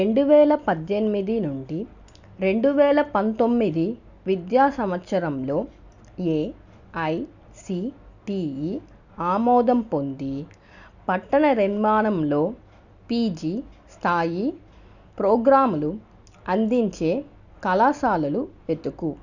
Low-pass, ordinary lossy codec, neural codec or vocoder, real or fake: 7.2 kHz; none; none; real